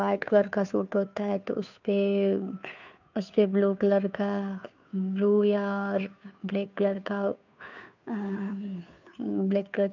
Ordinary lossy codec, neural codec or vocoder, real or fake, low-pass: none; codec, 16 kHz, 2 kbps, FunCodec, trained on Chinese and English, 25 frames a second; fake; 7.2 kHz